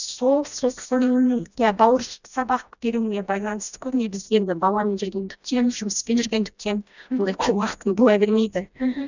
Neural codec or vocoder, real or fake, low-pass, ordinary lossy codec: codec, 16 kHz, 1 kbps, FreqCodec, smaller model; fake; 7.2 kHz; none